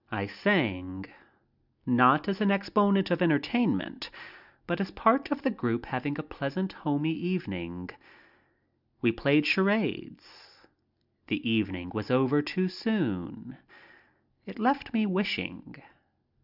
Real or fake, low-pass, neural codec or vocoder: real; 5.4 kHz; none